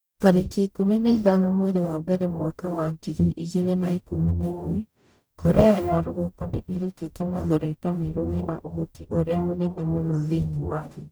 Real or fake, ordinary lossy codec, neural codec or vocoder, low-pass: fake; none; codec, 44.1 kHz, 0.9 kbps, DAC; none